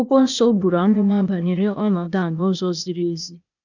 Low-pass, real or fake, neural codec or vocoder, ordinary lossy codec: 7.2 kHz; fake; codec, 16 kHz, 0.8 kbps, ZipCodec; none